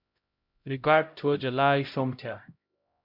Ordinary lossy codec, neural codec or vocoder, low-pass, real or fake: MP3, 48 kbps; codec, 16 kHz, 0.5 kbps, X-Codec, HuBERT features, trained on LibriSpeech; 5.4 kHz; fake